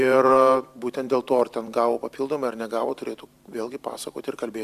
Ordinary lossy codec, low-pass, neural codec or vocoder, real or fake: AAC, 96 kbps; 14.4 kHz; vocoder, 44.1 kHz, 128 mel bands every 512 samples, BigVGAN v2; fake